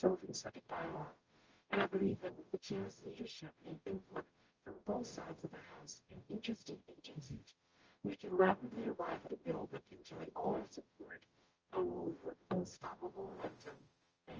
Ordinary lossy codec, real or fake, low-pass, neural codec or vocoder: Opus, 24 kbps; fake; 7.2 kHz; codec, 44.1 kHz, 0.9 kbps, DAC